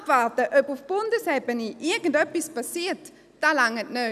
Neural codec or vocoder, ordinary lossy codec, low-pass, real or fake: none; none; 14.4 kHz; real